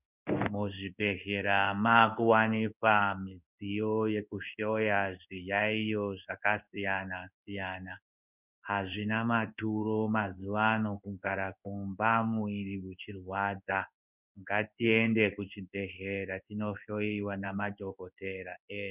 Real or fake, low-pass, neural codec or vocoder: fake; 3.6 kHz; codec, 16 kHz in and 24 kHz out, 1 kbps, XY-Tokenizer